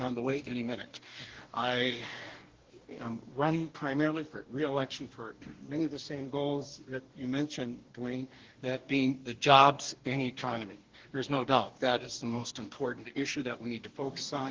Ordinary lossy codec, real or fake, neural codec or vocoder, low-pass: Opus, 16 kbps; fake; codec, 44.1 kHz, 2.6 kbps, DAC; 7.2 kHz